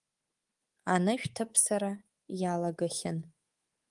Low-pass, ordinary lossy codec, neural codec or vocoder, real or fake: 10.8 kHz; Opus, 24 kbps; codec, 24 kHz, 3.1 kbps, DualCodec; fake